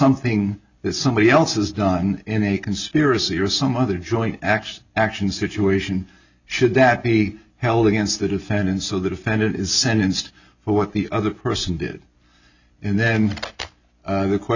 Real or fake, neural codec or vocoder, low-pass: real; none; 7.2 kHz